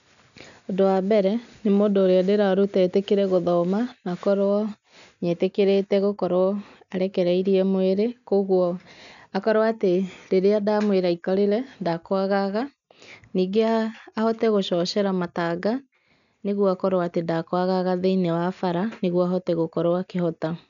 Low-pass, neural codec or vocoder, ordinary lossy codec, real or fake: 7.2 kHz; none; none; real